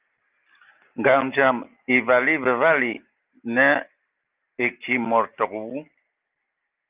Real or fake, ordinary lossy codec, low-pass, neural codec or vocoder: real; Opus, 32 kbps; 3.6 kHz; none